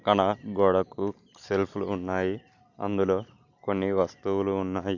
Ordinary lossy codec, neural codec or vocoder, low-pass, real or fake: none; none; 7.2 kHz; real